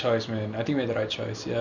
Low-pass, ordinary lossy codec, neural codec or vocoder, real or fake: 7.2 kHz; none; none; real